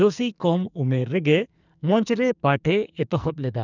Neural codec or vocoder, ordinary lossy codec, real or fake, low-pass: codec, 44.1 kHz, 2.6 kbps, SNAC; none; fake; 7.2 kHz